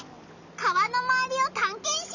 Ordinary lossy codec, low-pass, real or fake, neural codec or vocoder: none; 7.2 kHz; real; none